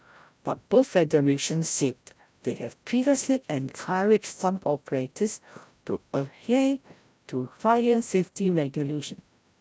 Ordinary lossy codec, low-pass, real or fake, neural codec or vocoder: none; none; fake; codec, 16 kHz, 0.5 kbps, FreqCodec, larger model